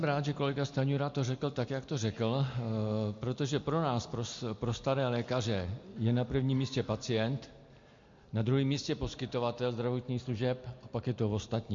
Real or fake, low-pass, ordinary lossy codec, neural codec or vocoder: real; 7.2 kHz; MP3, 48 kbps; none